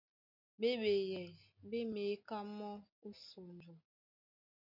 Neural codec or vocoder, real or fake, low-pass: none; real; 5.4 kHz